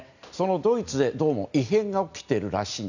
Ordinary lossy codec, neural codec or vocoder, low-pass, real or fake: none; none; 7.2 kHz; real